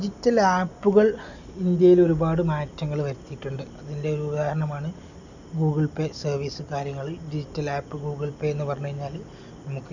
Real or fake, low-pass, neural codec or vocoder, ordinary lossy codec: real; 7.2 kHz; none; none